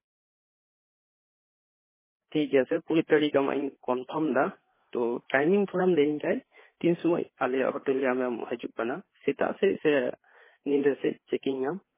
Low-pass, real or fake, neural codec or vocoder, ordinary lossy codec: 3.6 kHz; fake; codec, 16 kHz, 4 kbps, FreqCodec, larger model; MP3, 16 kbps